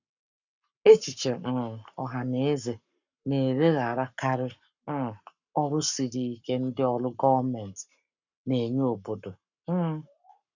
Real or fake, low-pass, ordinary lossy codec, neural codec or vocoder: fake; 7.2 kHz; none; codec, 44.1 kHz, 7.8 kbps, Pupu-Codec